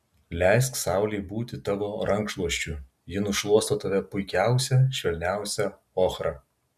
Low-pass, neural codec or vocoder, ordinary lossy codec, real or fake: 14.4 kHz; none; MP3, 96 kbps; real